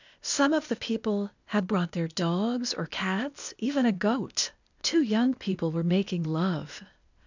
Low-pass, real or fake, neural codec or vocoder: 7.2 kHz; fake; codec, 16 kHz, 0.8 kbps, ZipCodec